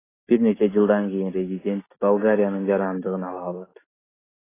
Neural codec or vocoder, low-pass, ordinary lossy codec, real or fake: none; 3.6 kHz; AAC, 16 kbps; real